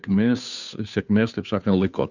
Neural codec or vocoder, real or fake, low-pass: codec, 16 kHz, 2 kbps, FunCodec, trained on Chinese and English, 25 frames a second; fake; 7.2 kHz